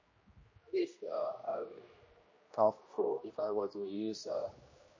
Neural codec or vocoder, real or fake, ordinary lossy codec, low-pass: codec, 16 kHz, 1 kbps, X-Codec, HuBERT features, trained on general audio; fake; MP3, 32 kbps; 7.2 kHz